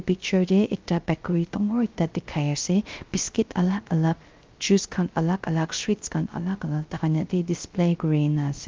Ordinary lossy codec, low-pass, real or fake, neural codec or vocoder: Opus, 32 kbps; 7.2 kHz; fake; codec, 16 kHz, 0.3 kbps, FocalCodec